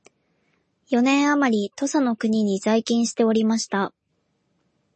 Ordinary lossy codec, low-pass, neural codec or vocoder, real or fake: MP3, 32 kbps; 10.8 kHz; none; real